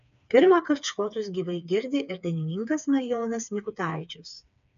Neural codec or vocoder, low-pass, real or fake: codec, 16 kHz, 4 kbps, FreqCodec, smaller model; 7.2 kHz; fake